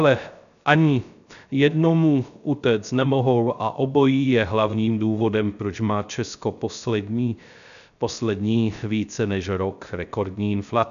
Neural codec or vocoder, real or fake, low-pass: codec, 16 kHz, 0.3 kbps, FocalCodec; fake; 7.2 kHz